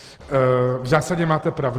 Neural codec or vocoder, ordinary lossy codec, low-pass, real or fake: none; Opus, 16 kbps; 14.4 kHz; real